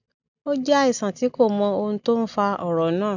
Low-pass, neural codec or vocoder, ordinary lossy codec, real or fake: 7.2 kHz; none; none; real